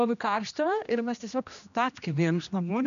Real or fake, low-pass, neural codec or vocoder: fake; 7.2 kHz; codec, 16 kHz, 1 kbps, X-Codec, HuBERT features, trained on general audio